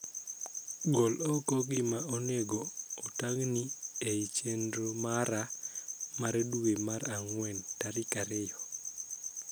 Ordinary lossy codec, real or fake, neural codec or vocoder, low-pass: none; real; none; none